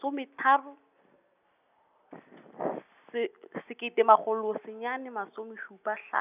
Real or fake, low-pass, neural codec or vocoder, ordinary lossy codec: real; 3.6 kHz; none; none